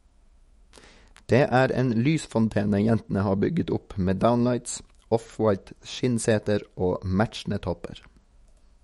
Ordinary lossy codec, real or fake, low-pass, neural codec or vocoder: MP3, 48 kbps; fake; 19.8 kHz; autoencoder, 48 kHz, 128 numbers a frame, DAC-VAE, trained on Japanese speech